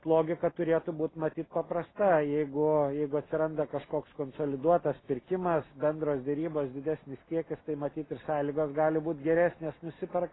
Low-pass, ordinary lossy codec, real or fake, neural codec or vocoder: 7.2 kHz; AAC, 16 kbps; real; none